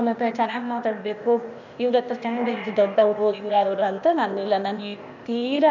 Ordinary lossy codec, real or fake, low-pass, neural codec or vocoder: none; fake; 7.2 kHz; codec, 16 kHz, 0.8 kbps, ZipCodec